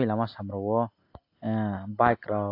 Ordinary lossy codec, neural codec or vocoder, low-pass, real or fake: AAC, 32 kbps; none; 5.4 kHz; real